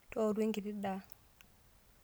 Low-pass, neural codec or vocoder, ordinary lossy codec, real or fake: none; none; none; real